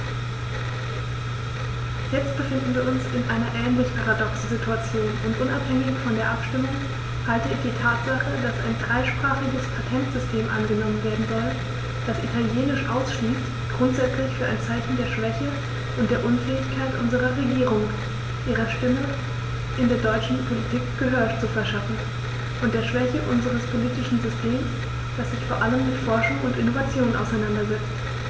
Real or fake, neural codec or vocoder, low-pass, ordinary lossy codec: real; none; none; none